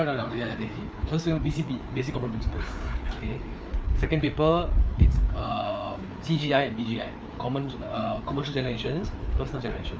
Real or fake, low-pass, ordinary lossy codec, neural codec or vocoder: fake; none; none; codec, 16 kHz, 4 kbps, FreqCodec, larger model